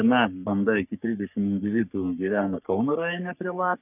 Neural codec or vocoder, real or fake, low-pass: codec, 44.1 kHz, 3.4 kbps, Pupu-Codec; fake; 3.6 kHz